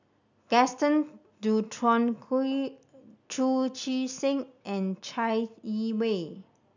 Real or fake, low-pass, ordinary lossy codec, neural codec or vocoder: real; 7.2 kHz; none; none